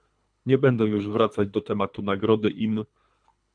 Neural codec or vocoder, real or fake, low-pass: codec, 24 kHz, 3 kbps, HILCodec; fake; 9.9 kHz